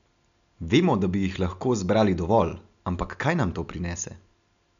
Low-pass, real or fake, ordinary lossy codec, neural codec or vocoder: 7.2 kHz; real; none; none